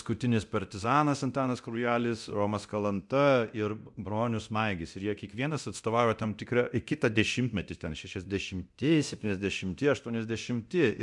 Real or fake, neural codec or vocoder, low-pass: fake; codec, 24 kHz, 0.9 kbps, DualCodec; 10.8 kHz